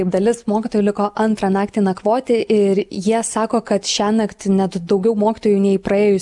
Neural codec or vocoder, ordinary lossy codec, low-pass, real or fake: none; MP3, 96 kbps; 10.8 kHz; real